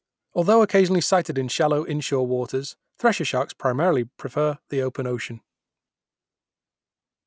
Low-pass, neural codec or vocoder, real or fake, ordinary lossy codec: none; none; real; none